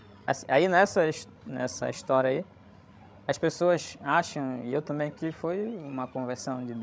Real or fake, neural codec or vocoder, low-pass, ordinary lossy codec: fake; codec, 16 kHz, 16 kbps, FreqCodec, larger model; none; none